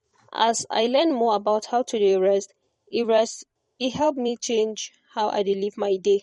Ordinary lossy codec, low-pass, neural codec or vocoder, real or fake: MP3, 48 kbps; 19.8 kHz; vocoder, 44.1 kHz, 128 mel bands every 256 samples, BigVGAN v2; fake